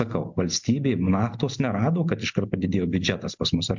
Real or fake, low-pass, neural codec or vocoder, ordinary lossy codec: real; 7.2 kHz; none; MP3, 64 kbps